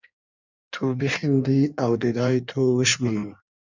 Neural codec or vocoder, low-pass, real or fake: codec, 16 kHz in and 24 kHz out, 1.1 kbps, FireRedTTS-2 codec; 7.2 kHz; fake